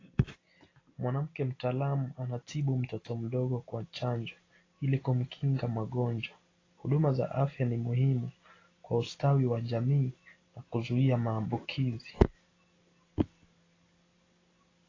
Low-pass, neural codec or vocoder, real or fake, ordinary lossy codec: 7.2 kHz; none; real; AAC, 32 kbps